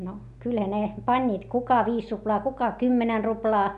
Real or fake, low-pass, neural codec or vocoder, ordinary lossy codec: real; 10.8 kHz; none; none